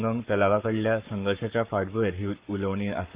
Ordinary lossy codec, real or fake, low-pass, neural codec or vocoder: Opus, 64 kbps; fake; 3.6 kHz; codec, 44.1 kHz, 7.8 kbps, Pupu-Codec